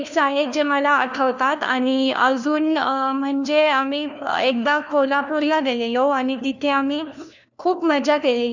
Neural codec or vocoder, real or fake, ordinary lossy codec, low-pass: codec, 16 kHz, 1 kbps, FunCodec, trained on LibriTTS, 50 frames a second; fake; none; 7.2 kHz